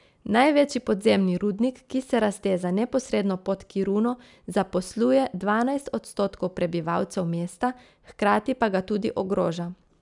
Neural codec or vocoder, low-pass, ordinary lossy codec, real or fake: none; 10.8 kHz; none; real